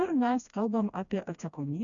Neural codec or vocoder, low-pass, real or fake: codec, 16 kHz, 1 kbps, FreqCodec, smaller model; 7.2 kHz; fake